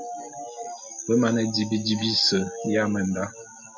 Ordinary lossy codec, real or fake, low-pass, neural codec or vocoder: MP3, 64 kbps; real; 7.2 kHz; none